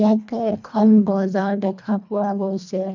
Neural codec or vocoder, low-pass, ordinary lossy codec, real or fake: codec, 24 kHz, 1.5 kbps, HILCodec; 7.2 kHz; none; fake